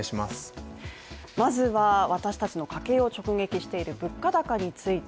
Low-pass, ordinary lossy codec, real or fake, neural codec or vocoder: none; none; real; none